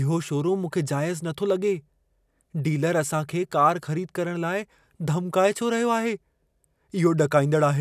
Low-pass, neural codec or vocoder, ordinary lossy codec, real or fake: 14.4 kHz; none; none; real